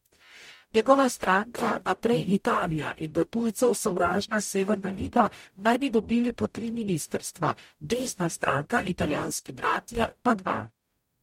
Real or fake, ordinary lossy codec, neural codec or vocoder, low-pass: fake; MP3, 64 kbps; codec, 44.1 kHz, 0.9 kbps, DAC; 19.8 kHz